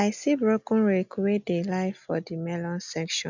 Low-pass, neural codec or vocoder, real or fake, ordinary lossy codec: 7.2 kHz; none; real; none